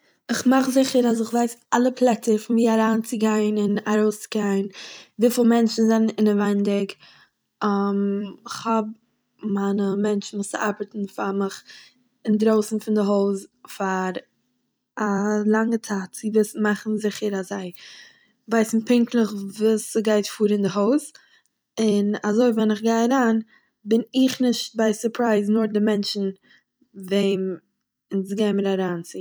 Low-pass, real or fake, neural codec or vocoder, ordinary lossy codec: none; fake; vocoder, 44.1 kHz, 128 mel bands every 256 samples, BigVGAN v2; none